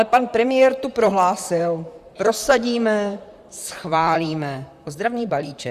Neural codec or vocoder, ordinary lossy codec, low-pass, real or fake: vocoder, 44.1 kHz, 128 mel bands, Pupu-Vocoder; Opus, 64 kbps; 14.4 kHz; fake